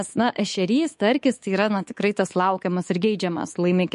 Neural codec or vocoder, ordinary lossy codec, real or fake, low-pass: codec, 24 kHz, 3.1 kbps, DualCodec; MP3, 48 kbps; fake; 10.8 kHz